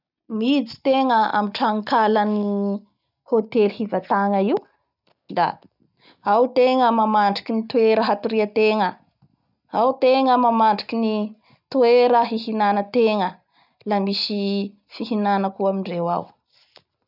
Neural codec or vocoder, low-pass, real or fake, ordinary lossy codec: none; 5.4 kHz; real; none